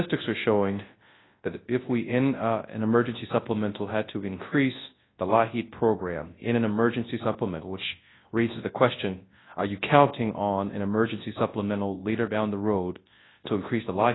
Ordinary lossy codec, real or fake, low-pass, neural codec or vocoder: AAC, 16 kbps; fake; 7.2 kHz; codec, 24 kHz, 0.9 kbps, WavTokenizer, large speech release